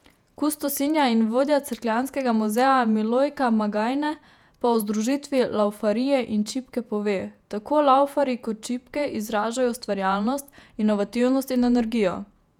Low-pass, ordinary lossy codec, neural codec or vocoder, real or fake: 19.8 kHz; none; vocoder, 48 kHz, 128 mel bands, Vocos; fake